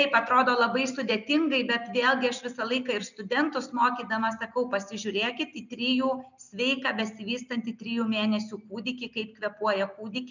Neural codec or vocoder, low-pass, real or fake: none; 7.2 kHz; real